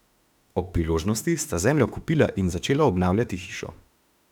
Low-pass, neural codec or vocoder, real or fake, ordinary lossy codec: 19.8 kHz; autoencoder, 48 kHz, 32 numbers a frame, DAC-VAE, trained on Japanese speech; fake; none